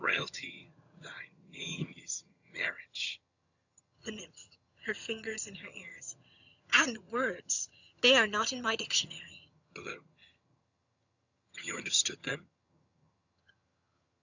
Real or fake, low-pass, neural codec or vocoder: fake; 7.2 kHz; vocoder, 22.05 kHz, 80 mel bands, HiFi-GAN